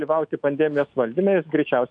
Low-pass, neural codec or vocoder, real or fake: 9.9 kHz; autoencoder, 48 kHz, 128 numbers a frame, DAC-VAE, trained on Japanese speech; fake